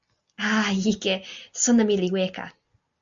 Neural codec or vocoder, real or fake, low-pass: none; real; 7.2 kHz